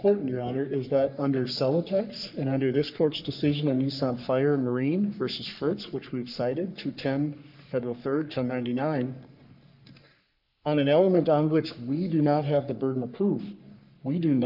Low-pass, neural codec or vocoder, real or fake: 5.4 kHz; codec, 44.1 kHz, 3.4 kbps, Pupu-Codec; fake